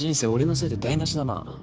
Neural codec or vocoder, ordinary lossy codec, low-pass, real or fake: codec, 16 kHz, 4 kbps, X-Codec, HuBERT features, trained on general audio; none; none; fake